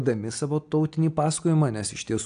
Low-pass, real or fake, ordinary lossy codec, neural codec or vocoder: 9.9 kHz; real; AAC, 64 kbps; none